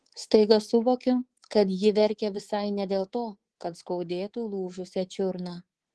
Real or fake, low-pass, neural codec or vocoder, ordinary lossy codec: fake; 10.8 kHz; codec, 24 kHz, 3.1 kbps, DualCodec; Opus, 16 kbps